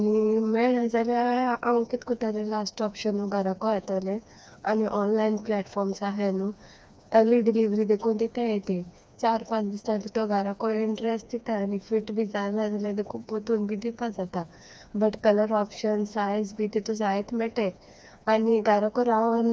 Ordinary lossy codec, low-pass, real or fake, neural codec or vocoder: none; none; fake; codec, 16 kHz, 2 kbps, FreqCodec, smaller model